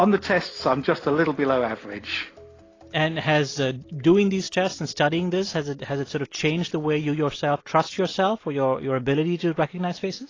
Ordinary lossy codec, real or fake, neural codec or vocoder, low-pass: AAC, 32 kbps; real; none; 7.2 kHz